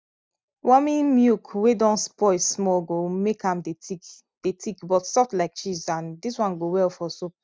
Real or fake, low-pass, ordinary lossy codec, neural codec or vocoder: real; none; none; none